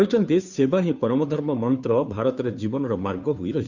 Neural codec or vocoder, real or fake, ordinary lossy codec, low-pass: codec, 16 kHz, 2 kbps, FunCodec, trained on Chinese and English, 25 frames a second; fake; none; 7.2 kHz